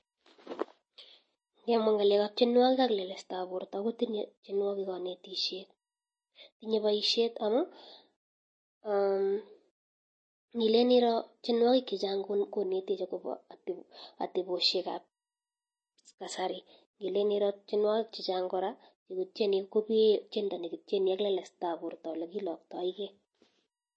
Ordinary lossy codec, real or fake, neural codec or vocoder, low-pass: MP3, 32 kbps; real; none; 9.9 kHz